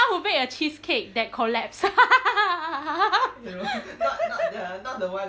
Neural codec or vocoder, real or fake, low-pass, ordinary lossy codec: none; real; none; none